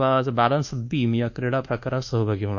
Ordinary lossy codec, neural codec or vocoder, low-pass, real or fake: none; codec, 24 kHz, 1.2 kbps, DualCodec; 7.2 kHz; fake